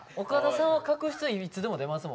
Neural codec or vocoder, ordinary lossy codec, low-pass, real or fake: none; none; none; real